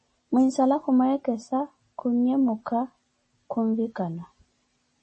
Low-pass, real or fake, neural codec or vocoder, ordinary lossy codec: 10.8 kHz; real; none; MP3, 32 kbps